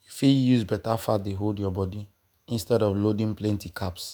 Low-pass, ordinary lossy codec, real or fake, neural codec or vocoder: none; none; fake; autoencoder, 48 kHz, 128 numbers a frame, DAC-VAE, trained on Japanese speech